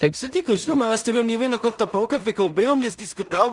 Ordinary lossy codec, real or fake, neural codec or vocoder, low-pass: Opus, 64 kbps; fake; codec, 16 kHz in and 24 kHz out, 0.4 kbps, LongCat-Audio-Codec, two codebook decoder; 10.8 kHz